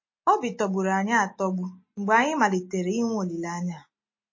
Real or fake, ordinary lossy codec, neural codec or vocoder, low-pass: real; MP3, 32 kbps; none; 7.2 kHz